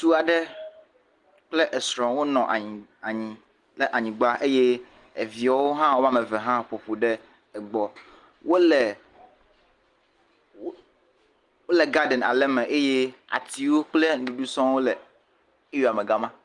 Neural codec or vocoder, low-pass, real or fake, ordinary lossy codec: none; 10.8 kHz; real; Opus, 24 kbps